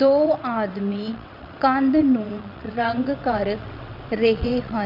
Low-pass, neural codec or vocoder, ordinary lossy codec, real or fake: 5.4 kHz; vocoder, 22.05 kHz, 80 mel bands, WaveNeXt; none; fake